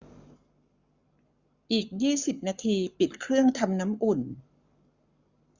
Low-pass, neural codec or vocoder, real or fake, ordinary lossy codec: 7.2 kHz; codec, 44.1 kHz, 7.8 kbps, Pupu-Codec; fake; Opus, 64 kbps